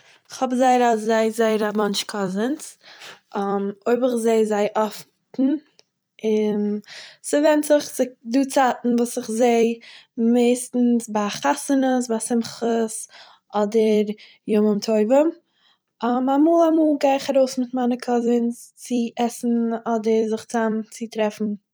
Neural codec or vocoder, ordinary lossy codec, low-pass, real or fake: vocoder, 44.1 kHz, 128 mel bands every 256 samples, BigVGAN v2; none; none; fake